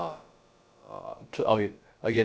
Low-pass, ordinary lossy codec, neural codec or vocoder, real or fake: none; none; codec, 16 kHz, about 1 kbps, DyCAST, with the encoder's durations; fake